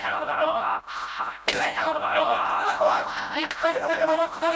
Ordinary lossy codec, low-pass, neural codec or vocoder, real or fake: none; none; codec, 16 kHz, 0.5 kbps, FreqCodec, smaller model; fake